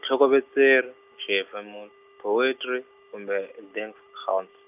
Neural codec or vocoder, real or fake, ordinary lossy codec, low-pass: none; real; none; 3.6 kHz